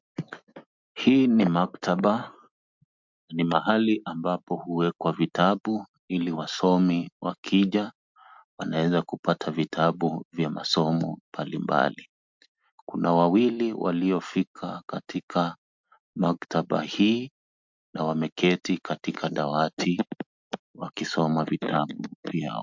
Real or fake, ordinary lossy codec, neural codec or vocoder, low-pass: real; MP3, 64 kbps; none; 7.2 kHz